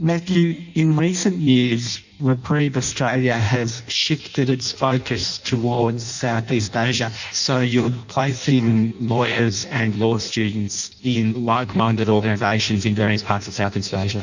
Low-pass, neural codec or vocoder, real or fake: 7.2 kHz; codec, 16 kHz in and 24 kHz out, 0.6 kbps, FireRedTTS-2 codec; fake